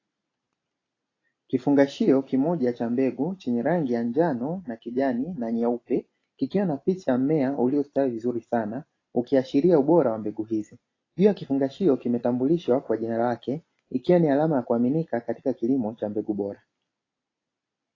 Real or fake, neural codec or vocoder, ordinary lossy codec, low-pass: real; none; AAC, 32 kbps; 7.2 kHz